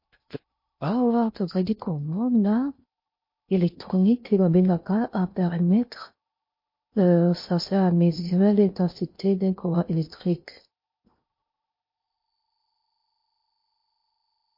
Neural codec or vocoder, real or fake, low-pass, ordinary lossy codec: codec, 16 kHz in and 24 kHz out, 0.6 kbps, FocalCodec, streaming, 2048 codes; fake; 5.4 kHz; MP3, 32 kbps